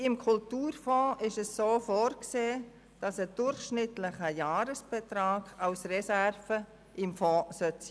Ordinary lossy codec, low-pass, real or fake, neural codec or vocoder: none; none; real; none